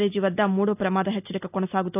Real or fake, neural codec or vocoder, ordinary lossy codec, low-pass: real; none; none; 3.6 kHz